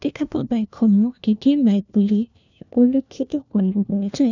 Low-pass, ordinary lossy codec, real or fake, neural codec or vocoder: 7.2 kHz; none; fake; codec, 16 kHz, 1 kbps, FunCodec, trained on LibriTTS, 50 frames a second